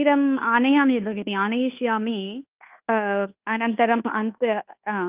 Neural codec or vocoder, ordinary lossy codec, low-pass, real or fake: codec, 24 kHz, 1.2 kbps, DualCodec; Opus, 32 kbps; 3.6 kHz; fake